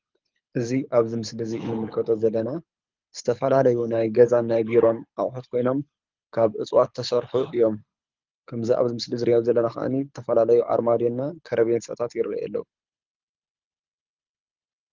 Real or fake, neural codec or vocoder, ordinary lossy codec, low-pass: fake; codec, 24 kHz, 6 kbps, HILCodec; Opus, 24 kbps; 7.2 kHz